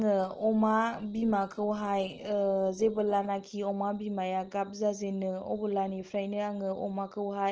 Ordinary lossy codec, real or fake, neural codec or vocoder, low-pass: Opus, 16 kbps; real; none; 7.2 kHz